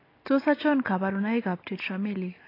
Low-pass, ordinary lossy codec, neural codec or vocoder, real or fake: 5.4 kHz; AAC, 24 kbps; none; real